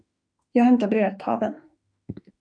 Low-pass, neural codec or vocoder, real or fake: 9.9 kHz; autoencoder, 48 kHz, 32 numbers a frame, DAC-VAE, trained on Japanese speech; fake